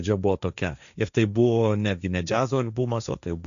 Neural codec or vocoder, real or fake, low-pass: codec, 16 kHz, 1.1 kbps, Voila-Tokenizer; fake; 7.2 kHz